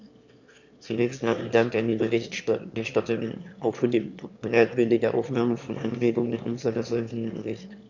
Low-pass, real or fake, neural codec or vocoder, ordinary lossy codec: 7.2 kHz; fake; autoencoder, 22.05 kHz, a latent of 192 numbers a frame, VITS, trained on one speaker; none